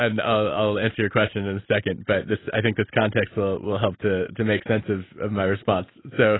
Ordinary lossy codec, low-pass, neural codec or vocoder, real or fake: AAC, 16 kbps; 7.2 kHz; none; real